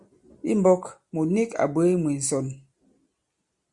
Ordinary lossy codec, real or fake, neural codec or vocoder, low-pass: Opus, 64 kbps; real; none; 10.8 kHz